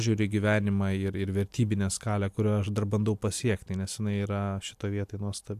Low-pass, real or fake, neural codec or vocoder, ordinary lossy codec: 14.4 kHz; real; none; AAC, 96 kbps